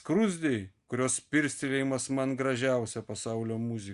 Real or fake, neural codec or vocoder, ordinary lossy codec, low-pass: real; none; Opus, 64 kbps; 10.8 kHz